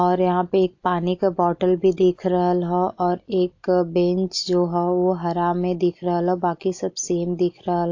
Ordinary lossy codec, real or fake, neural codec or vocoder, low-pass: AAC, 48 kbps; real; none; 7.2 kHz